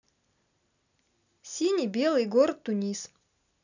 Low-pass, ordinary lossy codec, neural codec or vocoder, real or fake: 7.2 kHz; none; none; real